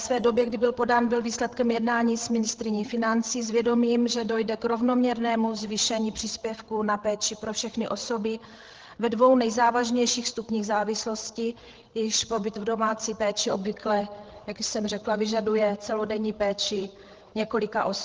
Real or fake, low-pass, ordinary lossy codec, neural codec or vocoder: fake; 7.2 kHz; Opus, 16 kbps; codec, 16 kHz, 8 kbps, FreqCodec, larger model